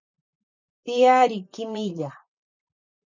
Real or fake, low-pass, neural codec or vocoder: fake; 7.2 kHz; vocoder, 44.1 kHz, 128 mel bands, Pupu-Vocoder